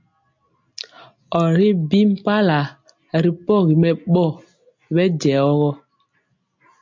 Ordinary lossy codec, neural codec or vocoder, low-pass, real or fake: MP3, 64 kbps; none; 7.2 kHz; real